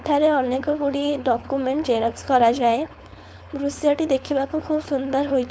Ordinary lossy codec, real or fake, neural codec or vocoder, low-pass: none; fake; codec, 16 kHz, 4.8 kbps, FACodec; none